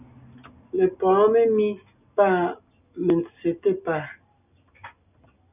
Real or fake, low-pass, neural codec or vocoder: real; 3.6 kHz; none